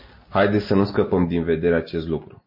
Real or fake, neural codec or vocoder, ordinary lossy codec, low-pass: real; none; MP3, 24 kbps; 5.4 kHz